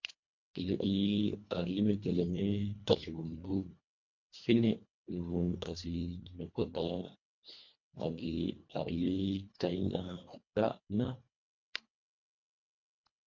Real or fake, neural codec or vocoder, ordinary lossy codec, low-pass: fake; codec, 24 kHz, 1.5 kbps, HILCodec; MP3, 48 kbps; 7.2 kHz